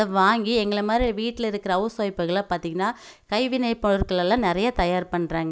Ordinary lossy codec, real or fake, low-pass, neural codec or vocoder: none; real; none; none